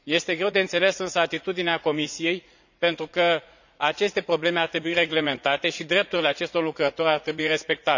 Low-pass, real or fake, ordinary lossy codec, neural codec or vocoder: 7.2 kHz; fake; none; vocoder, 44.1 kHz, 80 mel bands, Vocos